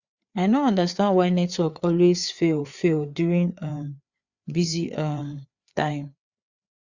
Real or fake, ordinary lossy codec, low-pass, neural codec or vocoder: fake; Opus, 64 kbps; 7.2 kHz; codec, 16 kHz, 4 kbps, FreqCodec, larger model